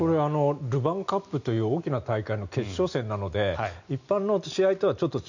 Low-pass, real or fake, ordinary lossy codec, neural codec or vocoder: 7.2 kHz; real; none; none